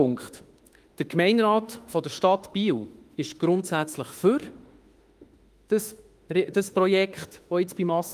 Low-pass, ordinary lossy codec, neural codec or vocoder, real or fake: 14.4 kHz; Opus, 32 kbps; autoencoder, 48 kHz, 32 numbers a frame, DAC-VAE, trained on Japanese speech; fake